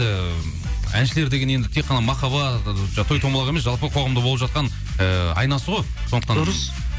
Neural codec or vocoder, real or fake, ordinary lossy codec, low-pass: none; real; none; none